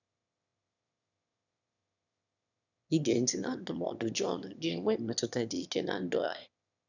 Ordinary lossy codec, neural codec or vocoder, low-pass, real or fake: none; autoencoder, 22.05 kHz, a latent of 192 numbers a frame, VITS, trained on one speaker; 7.2 kHz; fake